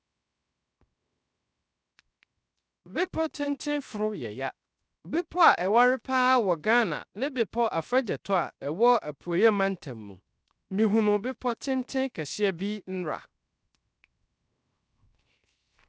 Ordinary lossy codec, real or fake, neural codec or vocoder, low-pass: none; fake; codec, 16 kHz, 0.7 kbps, FocalCodec; none